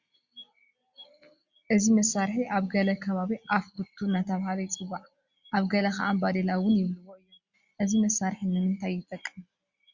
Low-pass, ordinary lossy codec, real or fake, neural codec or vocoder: 7.2 kHz; Opus, 64 kbps; real; none